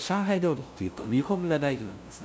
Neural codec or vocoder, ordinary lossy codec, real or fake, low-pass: codec, 16 kHz, 0.5 kbps, FunCodec, trained on LibriTTS, 25 frames a second; none; fake; none